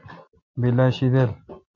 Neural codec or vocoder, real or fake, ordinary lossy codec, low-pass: none; real; MP3, 48 kbps; 7.2 kHz